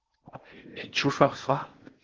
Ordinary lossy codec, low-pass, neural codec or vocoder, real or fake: Opus, 16 kbps; 7.2 kHz; codec, 16 kHz in and 24 kHz out, 0.8 kbps, FocalCodec, streaming, 65536 codes; fake